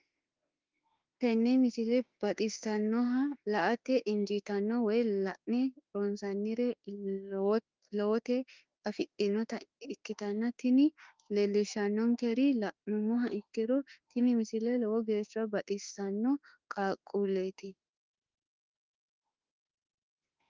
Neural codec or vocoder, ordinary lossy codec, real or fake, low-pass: autoencoder, 48 kHz, 32 numbers a frame, DAC-VAE, trained on Japanese speech; Opus, 32 kbps; fake; 7.2 kHz